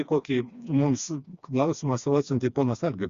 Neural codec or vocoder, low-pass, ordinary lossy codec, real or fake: codec, 16 kHz, 2 kbps, FreqCodec, smaller model; 7.2 kHz; Opus, 64 kbps; fake